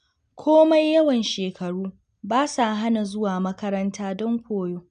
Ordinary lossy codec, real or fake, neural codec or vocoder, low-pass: none; real; none; 9.9 kHz